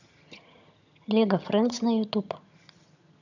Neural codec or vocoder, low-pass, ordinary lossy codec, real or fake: vocoder, 22.05 kHz, 80 mel bands, HiFi-GAN; 7.2 kHz; none; fake